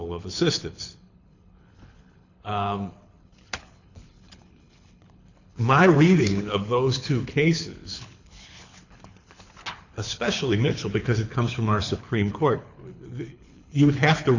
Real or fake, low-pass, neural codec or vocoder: fake; 7.2 kHz; codec, 24 kHz, 6 kbps, HILCodec